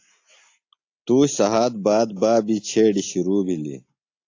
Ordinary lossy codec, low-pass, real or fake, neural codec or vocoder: AAC, 48 kbps; 7.2 kHz; real; none